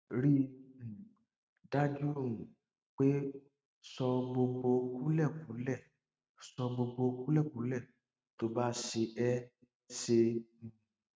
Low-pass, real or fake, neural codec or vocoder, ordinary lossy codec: none; real; none; none